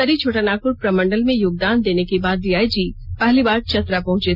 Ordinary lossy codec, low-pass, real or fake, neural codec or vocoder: none; 5.4 kHz; real; none